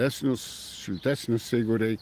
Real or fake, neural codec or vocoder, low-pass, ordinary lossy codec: real; none; 14.4 kHz; Opus, 24 kbps